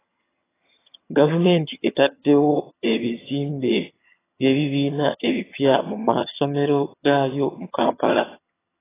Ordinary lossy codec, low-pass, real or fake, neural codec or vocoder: AAC, 16 kbps; 3.6 kHz; fake; vocoder, 22.05 kHz, 80 mel bands, HiFi-GAN